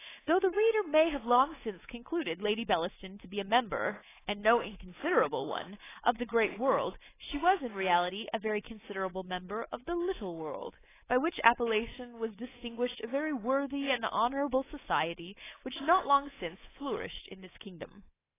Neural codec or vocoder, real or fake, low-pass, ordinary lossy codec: codec, 24 kHz, 3.1 kbps, DualCodec; fake; 3.6 kHz; AAC, 16 kbps